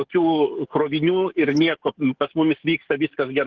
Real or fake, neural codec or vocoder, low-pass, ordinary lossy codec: fake; codec, 44.1 kHz, 7.8 kbps, DAC; 7.2 kHz; Opus, 16 kbps